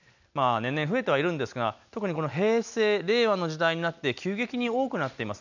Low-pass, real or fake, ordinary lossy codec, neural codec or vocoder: 7.2 kHz; real; none; none